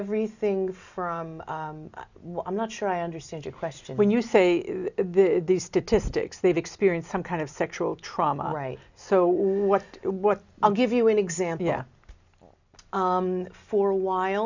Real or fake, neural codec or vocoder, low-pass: real; none; 7.2 kHz